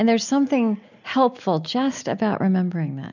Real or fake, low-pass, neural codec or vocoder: real; 7.2 kHz; none